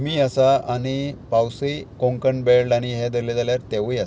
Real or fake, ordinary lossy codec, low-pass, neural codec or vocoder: real; none; none; none